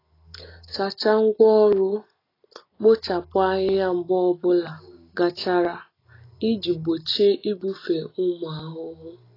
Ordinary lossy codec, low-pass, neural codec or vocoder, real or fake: AAC, 24 kbps; 5.4 kHz; none; real